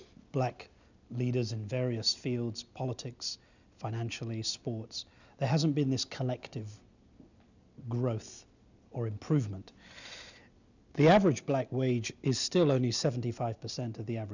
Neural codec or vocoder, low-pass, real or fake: none; 7.2 kHz; real